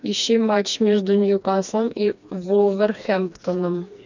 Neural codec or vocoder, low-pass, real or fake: codec, 16 kHz, 2 kbps, FreqCodec, smaller model; 7.2 kHz; fake